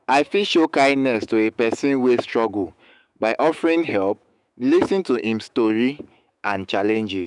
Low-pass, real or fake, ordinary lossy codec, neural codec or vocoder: 10.8 kHz; fake; none; autoencoder, 48 kHz, 128 numbers a frame, DAC-VAE, trained on Japanese speech